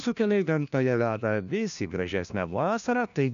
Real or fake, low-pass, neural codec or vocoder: fake; 7.2 kHz; codec, 16 kHz, 1 kbps, FunCodec, trained on LibriTTS, 50 frames a second